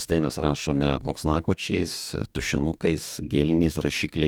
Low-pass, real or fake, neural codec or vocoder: 19.8 kHz; fake; codec, 44.1 kHz, 2.6 kbps, DAC